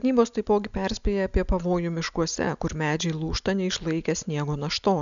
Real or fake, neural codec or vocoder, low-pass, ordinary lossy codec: real; none; 7.2 kHz; MP3, 96 kbps